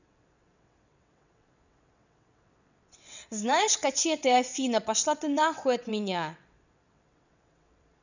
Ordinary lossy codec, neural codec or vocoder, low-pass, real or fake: none; vocoder, 22.05 kHz, 80 mel bands, WaveNeXt; 7.2 kHz; fake